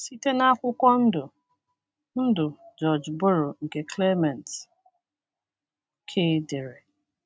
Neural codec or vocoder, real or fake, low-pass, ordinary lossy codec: none; real; none; none